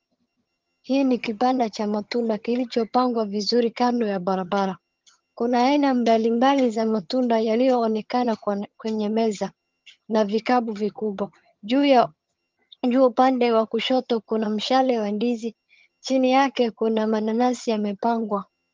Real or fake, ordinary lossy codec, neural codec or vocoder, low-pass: fake; Opus, 32 kbps; vocoder, 22.05 kHz, 80 mel bands, HiFi-GAN; 7.2 kHz